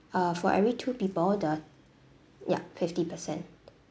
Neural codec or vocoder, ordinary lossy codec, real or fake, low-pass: none; none; real; none